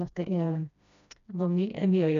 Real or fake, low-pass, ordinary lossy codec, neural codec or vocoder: fake; 7.2 kHz; none; codec, 16 kHz, 1 kbps, FreqCodec, smaller model